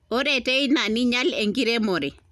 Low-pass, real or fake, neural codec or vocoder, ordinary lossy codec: 14.4 kHz; real; none; none